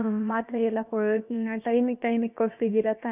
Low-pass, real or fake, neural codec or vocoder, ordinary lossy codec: 3.6 kHz; fake; codec, 16 kHz, about 1 kbps, DyCAST, with the encoder's durations; none